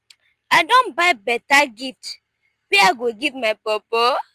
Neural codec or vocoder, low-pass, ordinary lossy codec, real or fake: none; 14.4 kHz; Opus, 32 kbps; real